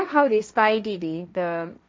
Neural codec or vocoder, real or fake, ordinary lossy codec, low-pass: codec, 16 kHz, 1.1 kbps, Voila-Tokenizer; fake; none; none